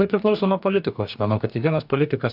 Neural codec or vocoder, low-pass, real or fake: codec, 44.1 kHz, 2.6 kbps, DAC; 5.4 kHz; fake